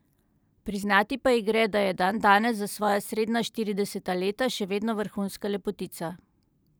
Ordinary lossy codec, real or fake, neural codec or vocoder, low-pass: none; fake; vocoder, 44.1 kHz, 128 mel bands every 512 samples, BigVGAN v2; none